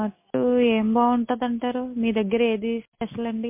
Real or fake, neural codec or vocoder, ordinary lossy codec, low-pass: real; none; MP3, 24 kbps; 3.6 kHz